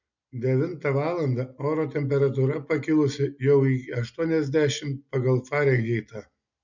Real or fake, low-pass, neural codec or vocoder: real; 7.2 kHz; none